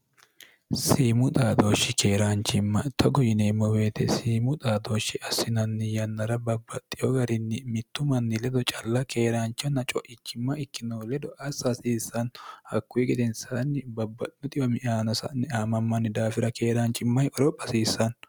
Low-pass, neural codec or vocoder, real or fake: 19.8 kHz; none; real